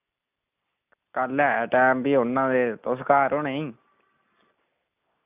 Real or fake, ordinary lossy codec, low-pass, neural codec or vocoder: real; none; 3.6 kHz; none